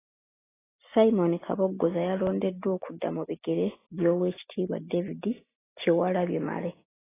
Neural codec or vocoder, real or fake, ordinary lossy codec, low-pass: none; real; AAC, 16 kbps; 3.6 kHz